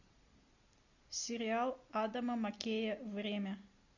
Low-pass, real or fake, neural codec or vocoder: 7.2 kHz; real; none